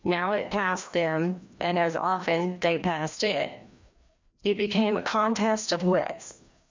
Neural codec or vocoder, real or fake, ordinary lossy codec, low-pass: codec, 16 kHz, 1 kbps, FreqCodec, larger model; fake; MP3, 64 kbps; 7.2 kHz